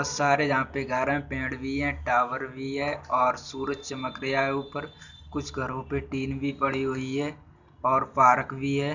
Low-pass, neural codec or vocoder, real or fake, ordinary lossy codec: 7.2 kHz; none; real; none